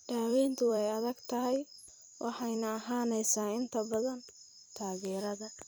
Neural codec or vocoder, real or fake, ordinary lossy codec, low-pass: vocoder, 44.1 kHz, 128 mel bands every 256 samples, BigVGAN v2; fake; none; none